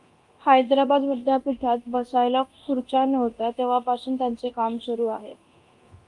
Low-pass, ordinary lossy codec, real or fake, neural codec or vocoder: 10.8 kHz; Opus, 32 kbps; fake; codec, 24 kHz, 0.9 kbps, DualCodec